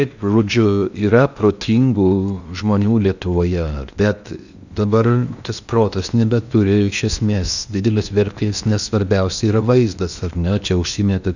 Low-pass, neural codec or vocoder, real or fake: 7.2 kHz; codec, 16 kHz in and 24 kHz out, 0.8 kbps, FocalCodec, streaming, 65536 codes; fake